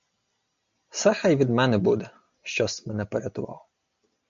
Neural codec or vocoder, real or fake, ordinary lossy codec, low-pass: none; real; MP3, 48 kbps; 7.2 kHz